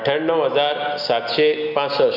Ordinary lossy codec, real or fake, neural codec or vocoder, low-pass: none; real; none; 5.4 kHz